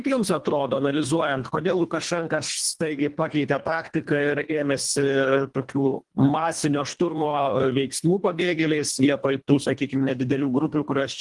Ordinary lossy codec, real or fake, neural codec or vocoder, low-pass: Opus, 24 kbps; fake; codec, 24 kHz, 1.5 kbps, HILCodec; 10.8 kHz